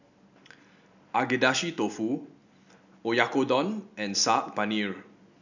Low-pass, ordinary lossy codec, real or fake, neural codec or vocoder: 7.2 kHz; none; real; none